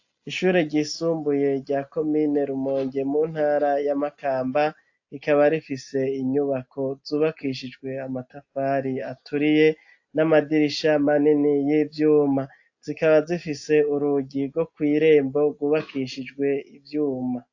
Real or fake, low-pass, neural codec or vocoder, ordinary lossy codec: real; 7.2 kHz; none; AAC, 48 kbps